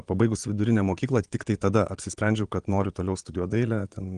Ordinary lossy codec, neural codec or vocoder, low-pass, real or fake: Opus, 24 kbps; vocoder, 22.05 kHz, 80 mel bands, Vocos; 9.9 kHz; fake